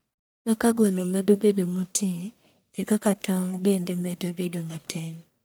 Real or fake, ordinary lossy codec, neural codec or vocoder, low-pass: fake; none; codec, 44.1 kHz, 1.7 kbps, Pupu-Codec; none